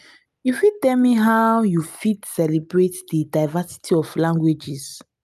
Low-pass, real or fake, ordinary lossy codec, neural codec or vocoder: 14.4 kHz; real; none; none